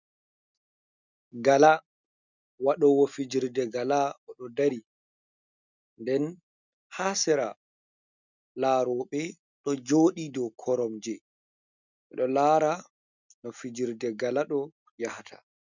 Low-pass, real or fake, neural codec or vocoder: 7.2 kHz; real; none